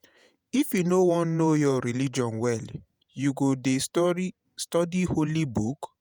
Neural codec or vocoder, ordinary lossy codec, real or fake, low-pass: vocoder, 48 kHz, 128 mel bands, Vocos; none; fake; none